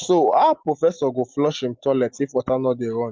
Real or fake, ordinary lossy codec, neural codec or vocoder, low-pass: real; Opus, 24 kbps; none; 7.2 kHz